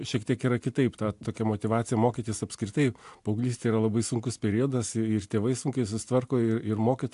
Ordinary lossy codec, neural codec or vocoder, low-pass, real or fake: AAC, 64 kbps; none; 10.8 kHz; real